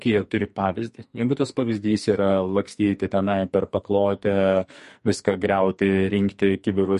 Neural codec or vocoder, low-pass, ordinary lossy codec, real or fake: codec, 44.1 kHz, 2.6 kbps, SNAC; 14.4 kHz; MP3, 48 kbps; fake